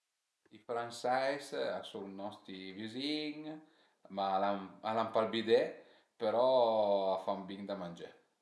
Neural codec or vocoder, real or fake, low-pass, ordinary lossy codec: none; real; none; none